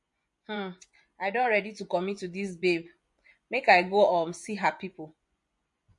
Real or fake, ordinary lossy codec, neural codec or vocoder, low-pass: fake; MP3, 48 kbps; vocoder, 24 kHz, 100 mel bands, Vocos; 10.8 kHz